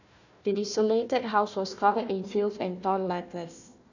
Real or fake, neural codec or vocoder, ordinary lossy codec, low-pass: fake; codec, 16 kHz, 1 kbps, FunCodec, trained on Chinese and English, 50 frames a second; none; 7.2 kHz